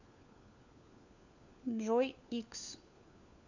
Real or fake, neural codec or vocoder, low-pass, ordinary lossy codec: fake; codec, 16 kHz, 4 kbps, FunCodec, trained on LibriTTS, 50 frames a second; 7.2 kHz; none